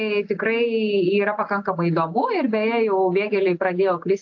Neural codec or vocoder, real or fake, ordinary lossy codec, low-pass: none; real; AAC, 48 kbps; 7.2 kHz